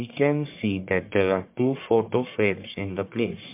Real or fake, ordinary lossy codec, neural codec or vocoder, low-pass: fake; MP3, 32 kbps; codec, 44.1 kHz, 1.7 kbps, Pupu-Codec; 3.6 kHz